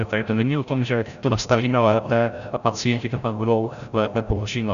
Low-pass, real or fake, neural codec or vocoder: 7.2 kHz; fake; codec, 16 kHz, 0.5 kbps, FreqCodec, larger model